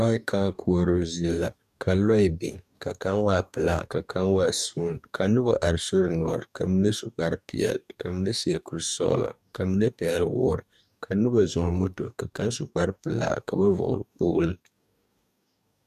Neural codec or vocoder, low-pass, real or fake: codec, 44.1 kHz, 2.6 kbps, DAC; 14.4 kHz; fake